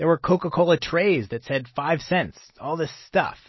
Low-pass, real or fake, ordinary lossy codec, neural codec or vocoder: 7.2 kHz; real; MP3, 24 kbps; none